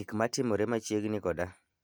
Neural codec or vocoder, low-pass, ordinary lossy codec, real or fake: none; none; none; real